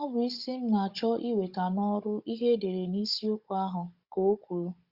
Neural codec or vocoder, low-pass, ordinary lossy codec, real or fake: codec, 16 kHz, 16 kbps, FunCodec, trained on Chinese and English, 50 frames a second; 5.4 kHz; Opus, 64 kbps; fake